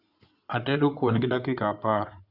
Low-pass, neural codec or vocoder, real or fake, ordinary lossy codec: 5.4 kHz; codec, 16 kHz in and 24 kHz out, 2.2 kbps, FireRedTTS-2 codec; fake; Opus, 64 kbps